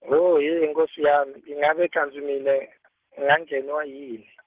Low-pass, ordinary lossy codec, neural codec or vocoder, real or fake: 3.6 kHz; Opus, 16 kbps; none; real